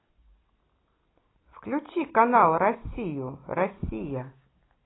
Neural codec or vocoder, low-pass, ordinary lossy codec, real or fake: none; 7.2 kHz; AAC, 16 kbps; real